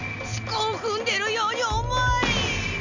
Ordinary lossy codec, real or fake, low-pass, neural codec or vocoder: none; real; 7.2 kHz; none